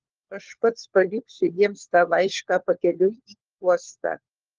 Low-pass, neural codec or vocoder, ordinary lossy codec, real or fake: 7.2 kHz; codec, 16 kHz, 4 kbps, FunCodec, trained on LibriTTS, 50 frames a second; Opus, 16 kbps; fake